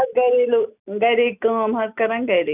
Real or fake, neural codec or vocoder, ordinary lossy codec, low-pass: real; none; none; 3.6 kHz